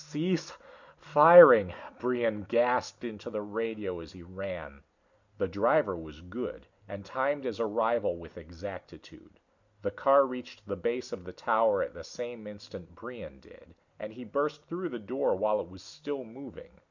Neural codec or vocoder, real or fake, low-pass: none; real; 7.2 kHz